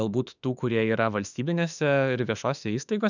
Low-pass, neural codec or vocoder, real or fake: 7.2 kHz; autoencoder, 48 kHz, 32 numbers a frame, DAC-VAE, trained on Japanese speech; fake